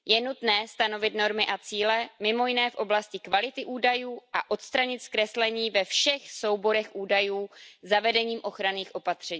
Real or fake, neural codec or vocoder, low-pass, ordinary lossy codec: real; none; none; none